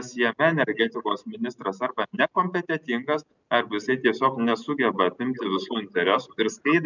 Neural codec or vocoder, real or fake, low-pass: none; real; 7.2 kHz